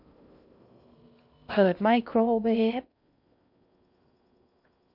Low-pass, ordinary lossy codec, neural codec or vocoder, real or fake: 5.4 kHz; none; codec, 16 kHz in and 24 kHz out, 0.6 kbps, FocalCodec, streaming, 2048 codes; fake